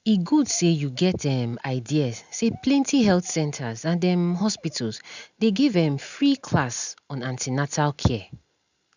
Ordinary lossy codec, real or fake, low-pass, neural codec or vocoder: none; real; 7.2 kHz; none